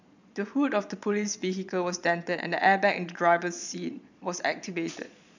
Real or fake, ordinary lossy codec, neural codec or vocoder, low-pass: fake; none; vocoder, 22.05 kHz, 80 mel bands, Vocos; 7.2 kHz